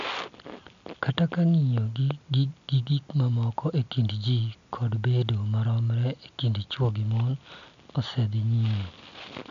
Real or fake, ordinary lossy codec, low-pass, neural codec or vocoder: real; none; 7.2 kHz; none